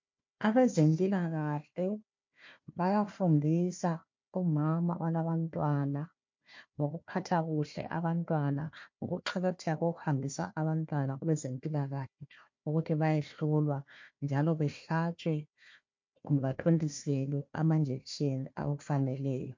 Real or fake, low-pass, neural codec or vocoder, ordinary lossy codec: fake; 7.2 kHz; codec, 16 kHz, 1 kbps, FunCodec, trained on Chinese and English, 50 frames a second; MP3, 48 kbps